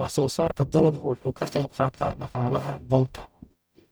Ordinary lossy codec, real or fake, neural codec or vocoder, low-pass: none; fake; codec, 44.1 kHz, 0.9 kbps, DAC; none